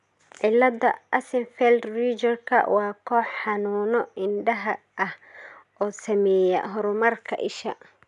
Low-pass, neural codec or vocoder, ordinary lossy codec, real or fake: 10.8 kHz; none; none; real